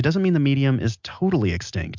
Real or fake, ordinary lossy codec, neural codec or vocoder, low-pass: real; MP3, 64 kbps; none; 7.2 kHz